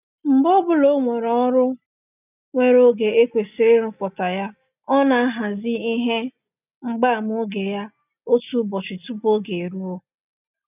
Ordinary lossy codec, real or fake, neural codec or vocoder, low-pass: none; real; none; 3.6 kHz